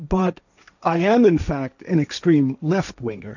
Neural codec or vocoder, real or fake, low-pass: codec, 16 kHz, 1.1 kbps, Voila-Tokenizer; fake; 7.2 kHz